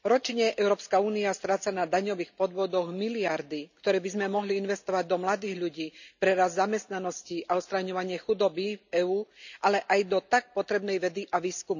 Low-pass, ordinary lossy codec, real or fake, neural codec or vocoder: 7.2 kHz; none; real; none